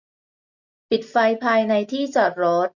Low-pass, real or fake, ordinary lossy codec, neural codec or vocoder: 7.2 kHz; real; none; none